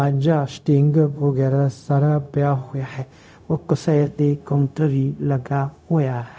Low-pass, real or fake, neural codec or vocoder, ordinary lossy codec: none; fake; codec, 16 kHz, 0.4 kbps, LongCat-Audio-Codec; none